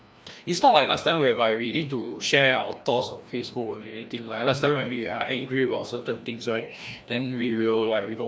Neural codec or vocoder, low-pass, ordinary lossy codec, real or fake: codec, 16 kHz, 1 kbps, FreqCodec, larger model; none; none; fake